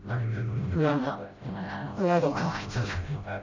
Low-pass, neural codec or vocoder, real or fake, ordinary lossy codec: 7.2 kHz; codec, 16 kHz, 0.5 kbps, FreqCodec, smaller model; fake; MP3, 48 kbps